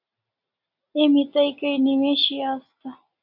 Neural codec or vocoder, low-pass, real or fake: none; 5.4 kHz; real